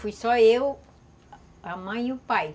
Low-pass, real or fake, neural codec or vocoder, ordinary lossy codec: none; real; none; none